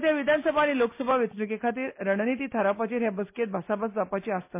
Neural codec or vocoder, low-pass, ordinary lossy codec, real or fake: none; 3.6 kHz; MP3, 24 kbps; real